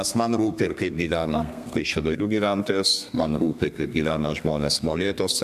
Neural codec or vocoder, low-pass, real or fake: codec, 32 kHz, 1.9 kbps, SNAC; 14.4 kHz; fake